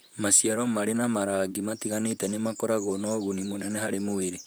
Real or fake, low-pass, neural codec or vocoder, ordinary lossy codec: fake; none; vocoder, 44.1 kHz, 128 mel bands, Pupu-Vocoder; none